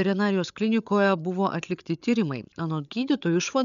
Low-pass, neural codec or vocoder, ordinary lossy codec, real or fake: 7.2 kHz; codec, 16 kHz, 8 kbps, FreqCodec, larger model; MP3, 96 kbps; fake